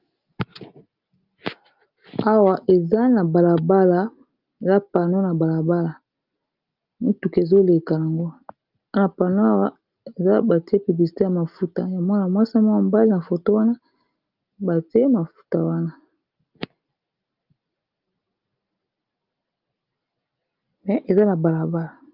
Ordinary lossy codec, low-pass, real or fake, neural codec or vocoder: Opus, 24 kbps; 5.4 kHz; real; none